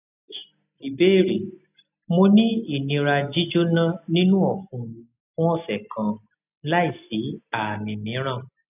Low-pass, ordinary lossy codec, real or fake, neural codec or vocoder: 3.6 kHz; none; real; none